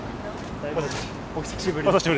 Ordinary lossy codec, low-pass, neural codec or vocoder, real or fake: none; none; none; real